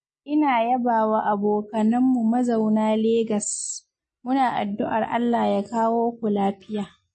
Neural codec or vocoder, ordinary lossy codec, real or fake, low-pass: none; MP3, 32 kbps; real; 10.8 kHz